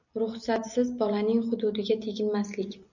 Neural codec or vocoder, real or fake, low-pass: none; real; 7.2 kHz